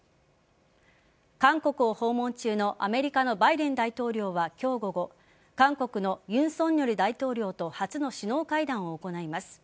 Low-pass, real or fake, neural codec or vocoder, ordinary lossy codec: none; real; none; none